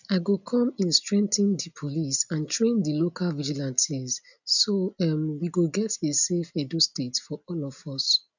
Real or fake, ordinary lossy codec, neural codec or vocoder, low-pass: real; none; none; 7.2 kHz